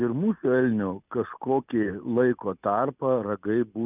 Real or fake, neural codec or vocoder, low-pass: real; none; 3.6 kHz